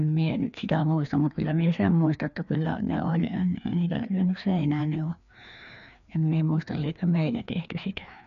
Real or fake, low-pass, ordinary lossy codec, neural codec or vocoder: fake; 7.2 kHz; none; codec, 16 kHz, 2 kbps, FreqCodec, larger model